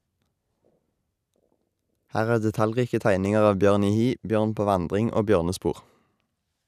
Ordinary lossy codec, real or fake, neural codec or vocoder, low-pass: none; real; none; 14.4 kHz